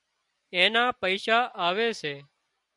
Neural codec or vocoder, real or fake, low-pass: none; real; 10.8 kHz